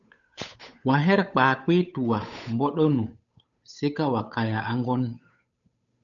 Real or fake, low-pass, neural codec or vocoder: fake; 7.2 kHz; codec, 16 kHz, 8 kbps, FunCodec, trained on Chinese and English, 25 frames a second